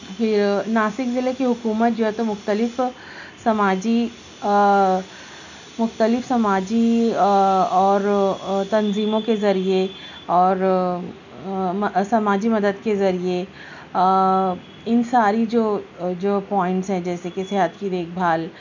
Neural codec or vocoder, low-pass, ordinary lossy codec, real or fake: none; 7.2 kHz; none; real